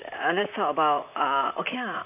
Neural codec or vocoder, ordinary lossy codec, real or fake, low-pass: vocoder, 44.1 kHz, 128 mel bands, Pupu-Vocoder; none; fake; 3.6 kHz